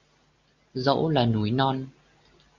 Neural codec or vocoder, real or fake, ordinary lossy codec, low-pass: none; real; Opus, 64 kbps; 7.2 kHz